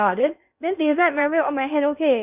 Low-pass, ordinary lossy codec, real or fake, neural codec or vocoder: 3.6 kHz; none; fake; codec, 16 kHz in and 24 kHz out, 0.6 kbps, FocalCodec, streaming, 2048 codes